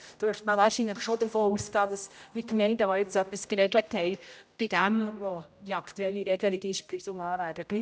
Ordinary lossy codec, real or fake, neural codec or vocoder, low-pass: none; fake; codec, 16 kHz, 0.5 kbps, X-Codec, HuBERT features, trained on general audio; none